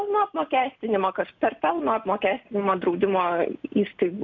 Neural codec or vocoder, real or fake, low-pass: none; real; 7.2 kHz